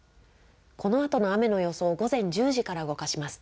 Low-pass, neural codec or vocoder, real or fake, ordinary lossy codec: none; none; real; none